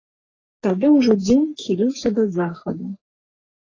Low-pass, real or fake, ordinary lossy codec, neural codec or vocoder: 7.2 kHz; fake; AAC, 32 kbps; codec, 44.1 kHz, 3.4 kbps, Pupu-Codec